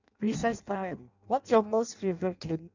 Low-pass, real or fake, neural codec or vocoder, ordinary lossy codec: 7.2 kHz; fake; codec, 16 kHz in and 24 kHz out, 0.6 kbps, FireRedTTS-2 codec; none